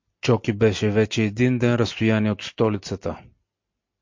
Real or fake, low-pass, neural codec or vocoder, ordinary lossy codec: real; 7.2 kHz; none; MP3, 48 kbps